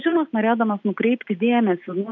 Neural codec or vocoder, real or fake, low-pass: none; real; 7.2 kHz